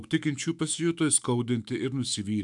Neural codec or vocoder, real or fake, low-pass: autoencoder, 48 kHz, 128 numbers a frame, DAC-VAE, trained on Japanese speech; fake; 10.8 kHz